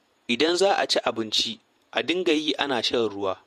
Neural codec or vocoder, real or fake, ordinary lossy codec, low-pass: vocoder, 48 kHz, 128 mel bands, Vocos; fake; MP3, 64 kbps; 14.4 kHz